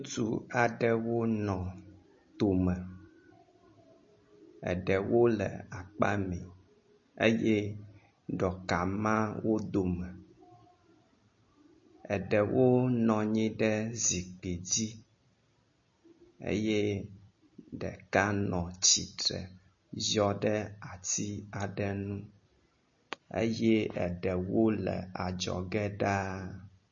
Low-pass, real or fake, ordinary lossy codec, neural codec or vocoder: 7.2 kHz; real; MP3, 32 kbps; none